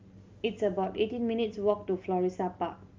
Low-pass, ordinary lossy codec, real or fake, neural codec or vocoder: 7.2 kHz; Opus, 32 kbps; real; none